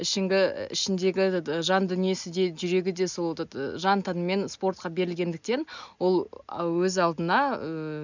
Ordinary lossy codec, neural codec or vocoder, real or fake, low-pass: none; none; real; 7.2 kHz